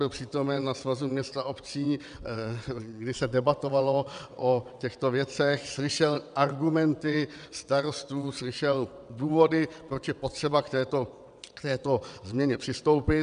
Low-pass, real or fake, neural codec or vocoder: 9.9 kHz; fake; vocoder, 22.05 kHz, 80 mel bands, WaveNeXt